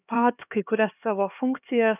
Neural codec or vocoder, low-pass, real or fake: codec, 16 kHz, 2 kbps, X-Codec, HuBERT features, trained on LibriSpeech; 3.6 kHz; fake